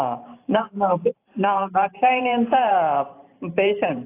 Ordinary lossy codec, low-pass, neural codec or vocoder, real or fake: AAC, 24 kbps; 3.6 kHz; vocoder, 44.1 kHz, 128 mel bands every 512 samples, BigVGAN v2; fake